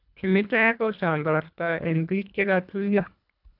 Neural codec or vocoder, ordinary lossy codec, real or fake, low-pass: codec, 24 kHz, 1.5 kbps, HILCodec; none; fake; 5.4 kHz